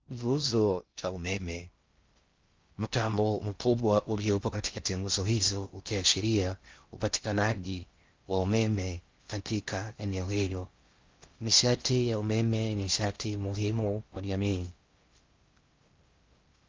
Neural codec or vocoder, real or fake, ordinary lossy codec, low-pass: codec, 16 kHz in and 24 kHz out, 0.6 kbps, FocalCodec, streaming, 4096 codes; fake; Opus, 24 kbps; 7.2 kHz